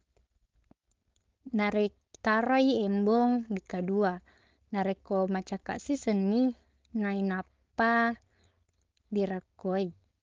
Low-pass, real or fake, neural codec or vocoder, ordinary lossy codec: 7.2 kHz; fake; codec, 16 kHz, 4.8 kbps, FACodec; Opus, 32 kbps